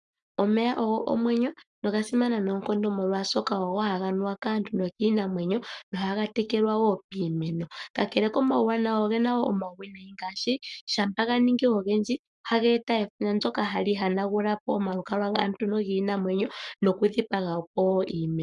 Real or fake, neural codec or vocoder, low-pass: fake; codec, 44.1 kHz, 7.8 kbps, Pupu-Codec; 10.8 kHz